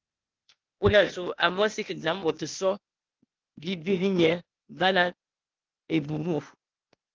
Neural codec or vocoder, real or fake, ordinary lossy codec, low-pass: codec, 16 kHz, 0.8 kbps, ZipCodec; fake; Opus, 32 kbps; 7.2 kHz